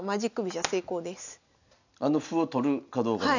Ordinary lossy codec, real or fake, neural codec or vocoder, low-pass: none; real; none; 7.2 kHz